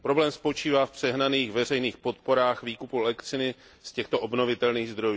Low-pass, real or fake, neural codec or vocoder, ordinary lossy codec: none; real; none; none